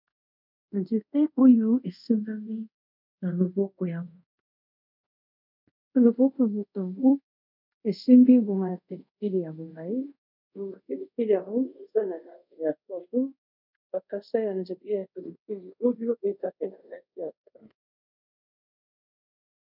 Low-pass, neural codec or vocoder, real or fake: 5.4 kHz; codec, 24 kHz, 0.5 kbps, DualCodec; fake